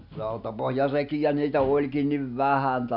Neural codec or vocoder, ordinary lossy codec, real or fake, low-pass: none; none; real; 5.4 kHz